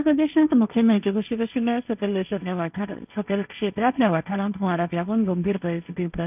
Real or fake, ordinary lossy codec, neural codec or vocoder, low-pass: fake; none; codec, 16 kHz, 1.1 kbps, Voila-Tokenizer; 3.6 kHz